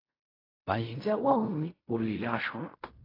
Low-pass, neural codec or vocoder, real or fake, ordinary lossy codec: 5.4 kHz; codec, 16 kHz in and 24 kHz out, 0.4 kbps, LongCat-Audio-Codec, fine tuned four codebook decoder; fake; AAC, 24 kbps